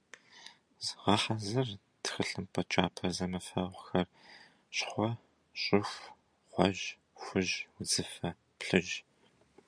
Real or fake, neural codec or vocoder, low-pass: real; none; 9.9 kHz